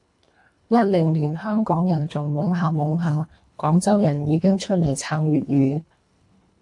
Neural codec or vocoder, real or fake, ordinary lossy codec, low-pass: codec, 24 kHz, 1.5 kbps, HILCodec; fake; AAC, 64 kbps; 10.8 kHz